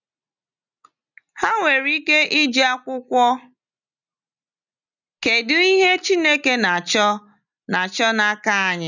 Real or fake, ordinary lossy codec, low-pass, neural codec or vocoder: real; none; 7.2 kHz; none